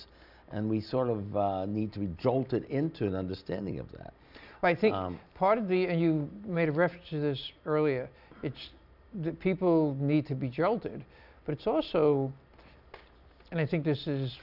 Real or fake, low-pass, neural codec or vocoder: real; 5.4 kHz; none